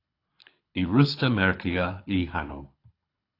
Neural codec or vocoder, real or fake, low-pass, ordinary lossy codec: codec, 24 kHz, 6 kbps, HILCodec; fake; 5.4 kHz; AAC, 32 kbps